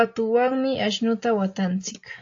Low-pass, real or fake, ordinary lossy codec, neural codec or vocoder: 7.2 kHz; real; AAC, 48 kbps; none